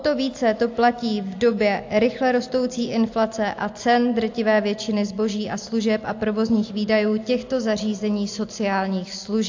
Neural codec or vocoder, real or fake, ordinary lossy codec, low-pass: none; real; AAC, 48 kbps; 7.2 kHz